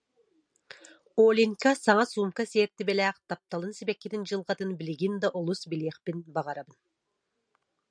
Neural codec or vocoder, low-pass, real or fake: none; 9.9 kHz; real